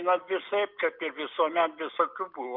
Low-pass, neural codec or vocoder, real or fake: 5.4 kHz; none; real